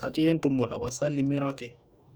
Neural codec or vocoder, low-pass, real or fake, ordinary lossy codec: codec, 44.1 kHz, 2.6 kbps, DAC; none; fake; none